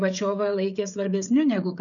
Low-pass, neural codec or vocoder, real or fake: 7.2 kHz; codec, 16 kHz, 16 kbps, FreqCodec, smaller model; fake